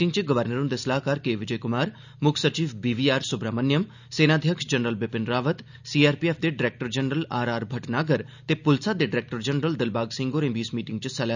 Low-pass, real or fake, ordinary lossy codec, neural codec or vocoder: 7.2 kHz; real; none; none